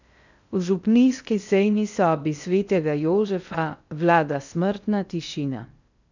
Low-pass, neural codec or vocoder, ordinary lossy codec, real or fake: 7.2 kHz; codec, 16 kHz in and 24 kHz out, 0.6 kbps, FocalCodec, streaming, 2048 codes; none; fake